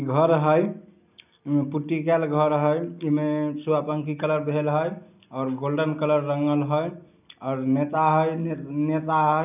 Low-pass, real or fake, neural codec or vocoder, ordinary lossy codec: 3.6 kHz; real; none; none